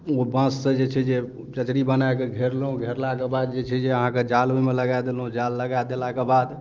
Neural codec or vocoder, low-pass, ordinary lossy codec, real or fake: none; 7.2 kHz; Opus, 32 kbps; real